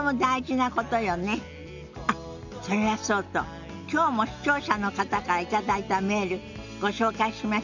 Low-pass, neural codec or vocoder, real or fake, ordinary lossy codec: 7.2 kHz; none; real; none